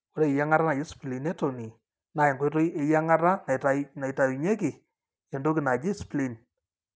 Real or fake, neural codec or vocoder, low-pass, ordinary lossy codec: real; none; none; none